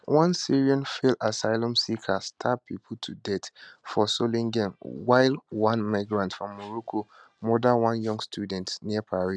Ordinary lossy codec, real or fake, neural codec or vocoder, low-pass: none; real; none; 9.9 kHz